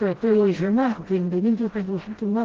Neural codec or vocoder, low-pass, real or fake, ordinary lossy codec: codec, 16 kHz, 0.5 kbps, FreqCodec, smaller model; 7.2 kHz; fake; Opus, 16 kbps